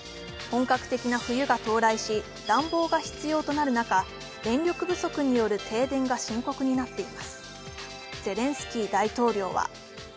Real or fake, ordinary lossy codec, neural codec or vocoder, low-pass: real; none; none; none